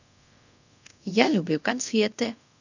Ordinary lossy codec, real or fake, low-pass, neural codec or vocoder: none; fake; 7.2 kHz; codec, 24 kHz, 0.5 kbps, DualCodec